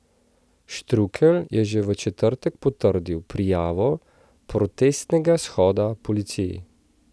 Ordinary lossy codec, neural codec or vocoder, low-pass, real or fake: none; none; none; real